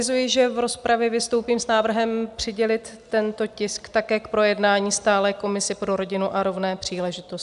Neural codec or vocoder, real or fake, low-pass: none; real; 10.8 kHz